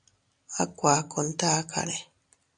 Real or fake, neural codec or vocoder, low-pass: real; none; 9.9 kHz